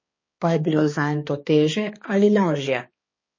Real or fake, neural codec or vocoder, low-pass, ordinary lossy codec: fake; codec, 16 kHz, 2 kbps, X-Codec, HuBERT features, trained on balanced general audio; 7.2 kHz; MP3, 32 kbps